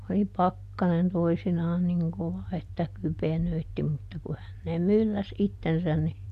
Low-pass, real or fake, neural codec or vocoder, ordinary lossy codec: 14.4 kHz; real; none; none